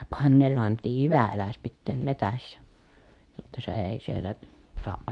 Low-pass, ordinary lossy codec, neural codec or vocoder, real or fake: 10.8 kHz; MP3, 64 kbps; codec, 24 kHz, 0.9 kbps, WavTokenizer, medium speech release version 2; fake